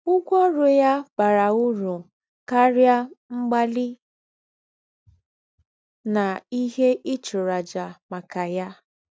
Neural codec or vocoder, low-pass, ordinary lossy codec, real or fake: none; none; none; real